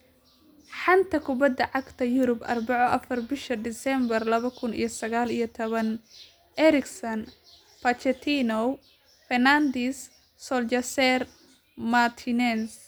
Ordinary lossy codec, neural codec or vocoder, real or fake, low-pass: none; none; real; none